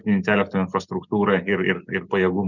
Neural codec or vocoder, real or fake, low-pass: none; real; 7.2 kHz